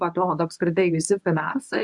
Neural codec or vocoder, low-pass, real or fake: codec, 24 kHz, 0.9 kbps, WavTokenizer, medium speech release version 1; 10.8 kHz; fake